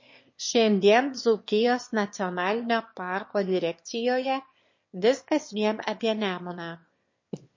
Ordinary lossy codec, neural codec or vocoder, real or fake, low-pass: MP3, 32 kbps; autoencoder, 22.05 kHz, a latent of 192 numbers a frame, VITS, trained on one speaker; fake; 7.2 kHz